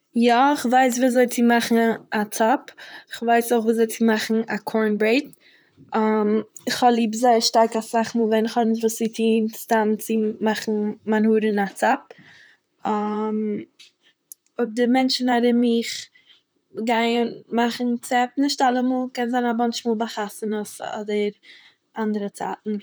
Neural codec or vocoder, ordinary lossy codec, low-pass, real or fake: vocoder, 44.1 kHz, 128 mel bands, Pupu-Vocoder; none; none; fake